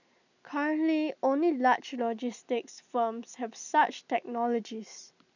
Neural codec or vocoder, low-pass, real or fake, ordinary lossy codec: none; 7.2 kHz; real; none